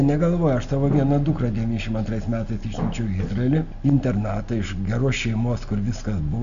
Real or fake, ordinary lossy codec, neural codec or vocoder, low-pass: real; AAC, 96 kbps; none; 7.2 kHz